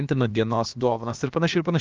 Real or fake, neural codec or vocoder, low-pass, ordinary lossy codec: fake; codec, 16 kHz, about 1 kbps, DyCAST, with the encoder's durations; 7.2 kHz; Opus, 32 kbps